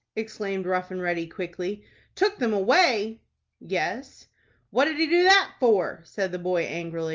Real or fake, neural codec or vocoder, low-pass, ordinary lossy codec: real; none; 7.2 kHz; Opus, 24 kbps